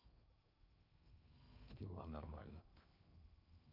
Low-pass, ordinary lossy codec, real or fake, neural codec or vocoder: 5.4 kHz; none; fake; codec, 16 kHz in and 24 kHz out, 0.8 kbps, FocalCodec, streaming, 65536 codes